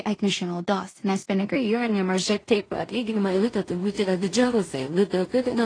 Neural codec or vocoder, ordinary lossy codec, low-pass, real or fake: codec, 16 kHz in and 24 kHz out, 0.4 kbps, LongCat-Audio-Codec, two codebook decoder; AAC, 32 kbps; 9.9 kHz; fake